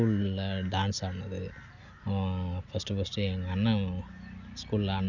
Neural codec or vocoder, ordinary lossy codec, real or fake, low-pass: none; Opus, 64 kbps; real; 7.2 kHz